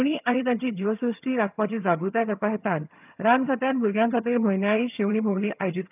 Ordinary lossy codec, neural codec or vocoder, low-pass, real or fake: none; vocoder, 22.05 kHz, 80 mel bands, HiFi-GAN; 3.6 kHz; fake